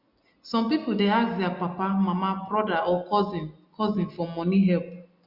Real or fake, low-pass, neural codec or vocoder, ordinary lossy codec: real; 5.4 kHz; none; Opus, 64 kbps